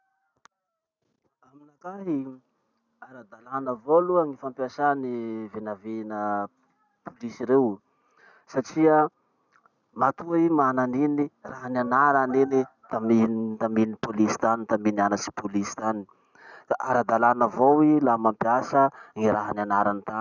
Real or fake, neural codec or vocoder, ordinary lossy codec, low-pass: real; none; none; 7.2 kHz